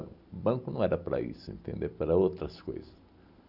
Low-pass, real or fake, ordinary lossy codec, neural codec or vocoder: 5.4 kHz; real; none; none